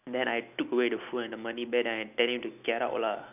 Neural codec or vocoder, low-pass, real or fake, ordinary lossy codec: none; 3.6 kHz; real; none